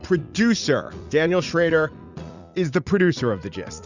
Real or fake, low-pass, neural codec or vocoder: fake; 7.2 kHz; vocoder, 44.1 kHz, 80 mel bands, Vocos